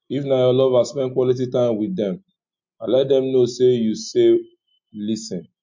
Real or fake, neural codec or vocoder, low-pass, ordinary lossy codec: real; none; 7.2 kHz; MP3, 48 kbps